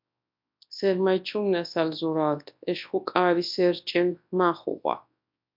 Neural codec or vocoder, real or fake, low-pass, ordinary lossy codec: codec, 24 kHz, 0.9 kbps, WavTokenizer, large speech release; fake; 5.4 kHz; MP3, 48 kbps